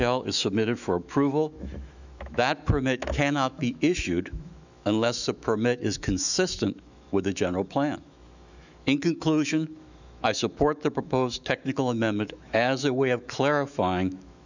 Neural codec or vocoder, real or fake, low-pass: autoencoder, 48 kHz, 128 numbers a frame, DAC-VAE, trained on Japanese speech; fake; 7.2 kHz